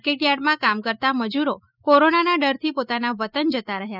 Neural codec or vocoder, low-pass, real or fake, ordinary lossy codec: none; 5.4 kHz; real; none